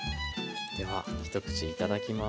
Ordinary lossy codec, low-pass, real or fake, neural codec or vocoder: none; none; real; none